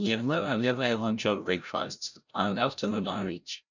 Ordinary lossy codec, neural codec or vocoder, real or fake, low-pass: none; codec, 16 kHz, 0.5 kbps, FreqCodec, larger model; fake; 7.2 kHz